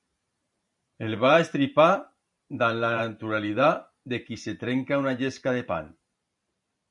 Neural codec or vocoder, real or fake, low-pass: vocoder, 44.1 kHz, 128 mel bands every 512 samples, BigVGAN v2; fake; 10.8 kHz